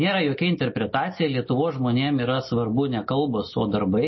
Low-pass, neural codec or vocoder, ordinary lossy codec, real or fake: 7.2 kHz; none; MP3, 24 kbps; real